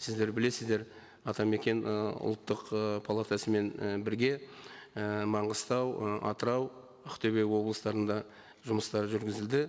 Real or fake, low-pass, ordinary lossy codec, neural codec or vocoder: real; none; none; none